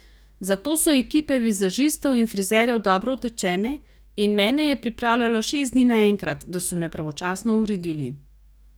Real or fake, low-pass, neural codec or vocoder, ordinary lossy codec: fake; none; codec, 44.1 kHz, 2.6 kbps, DAC; none